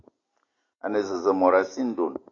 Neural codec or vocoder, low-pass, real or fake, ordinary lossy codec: none; 7.2 kHz; real; AAC, 32 kbps